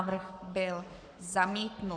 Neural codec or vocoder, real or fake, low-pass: codec, 44.1 kHz, 7.8 kbps, Pupu-Codec; fake; 9.9 kHz